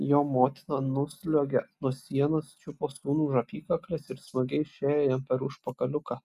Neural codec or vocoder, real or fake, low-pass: none; real; 14.4 kHz